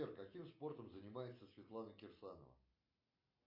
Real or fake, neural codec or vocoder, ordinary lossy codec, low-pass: real; none; MP3, 32 kbps; 5.4 kHz